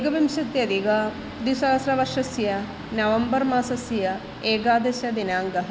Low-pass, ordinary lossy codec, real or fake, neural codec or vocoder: none; none; real; none